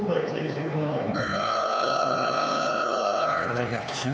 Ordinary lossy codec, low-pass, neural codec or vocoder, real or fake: none; none; codec, 16 kHz, 4 kbps, X-Codec, HuBERT features, trained on LibriSpeech; fake